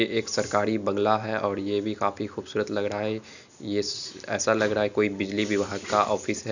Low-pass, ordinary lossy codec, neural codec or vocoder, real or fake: 7.2 kHz; none; none; real